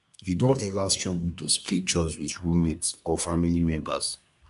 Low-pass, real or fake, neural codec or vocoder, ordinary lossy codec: 10.8 kHz; fake; codec, 24 kHz, 1 kbps, SNAC; none